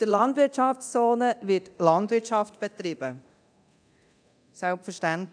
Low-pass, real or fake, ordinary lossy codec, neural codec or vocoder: 9.9 kHz; fake; none; codec, 24 kHz, 0.9 kbps, DualCodec